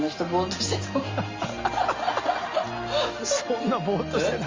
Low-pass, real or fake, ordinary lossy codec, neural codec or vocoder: 7.2 kHz; real; Opus, 32 kbps; none